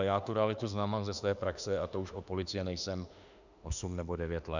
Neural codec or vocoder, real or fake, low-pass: autoencoder, 48 kHz, 32 numbers a frame, DAC-VAE, trained on Japanese speech; fake; 7.2 kHz